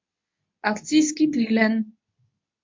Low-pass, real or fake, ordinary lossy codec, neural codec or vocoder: 7.2 kHz; fake; MP3, 48 kbps; codec, 24 kHz, 0.9 kbps, WavTokenizer, medium speech release version 2